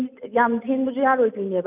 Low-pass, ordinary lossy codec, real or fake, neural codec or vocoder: 3.6 kHz; none; real; none